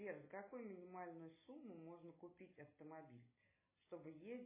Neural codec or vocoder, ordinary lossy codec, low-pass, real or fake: none; MP3, 16 kbps; 3.6 kHz; real